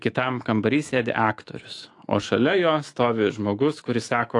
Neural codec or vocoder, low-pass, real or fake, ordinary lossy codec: autoencoder, 48 kHz, 128 numbers a frame, DAC-VAE, trained on Japanese speech; 10.8 kHz; fake; AAC, 48 kbps